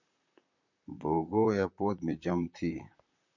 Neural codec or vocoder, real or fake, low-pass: vocoder, 44.1 kHz, 128 mel bands, Pupu-Vocoder; fake; 7.2 kHz